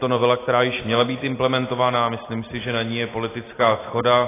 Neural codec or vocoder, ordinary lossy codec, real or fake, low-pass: none; AAC, 16 kbps; real; 3.6 kHz